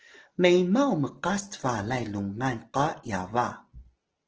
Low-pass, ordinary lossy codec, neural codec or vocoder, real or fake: 7.2 kHz; Opus, 16 kbps; none; real